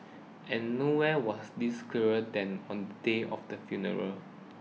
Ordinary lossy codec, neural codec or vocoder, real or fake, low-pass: none; none; real; none